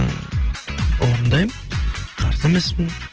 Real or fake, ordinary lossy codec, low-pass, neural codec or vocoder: real; Opus, 16 kbps; 7.2 kHz; none